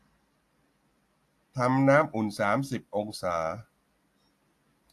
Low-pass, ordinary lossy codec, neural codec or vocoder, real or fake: 14.4 kHz; AAC, 64 kbps; none; real